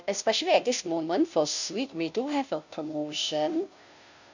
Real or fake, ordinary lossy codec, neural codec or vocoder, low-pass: fake; none; codec, 16 kHz, 0.5 kbps, FunCodec, trained on Chinese and English, 25 frames a second; 7.2 kHz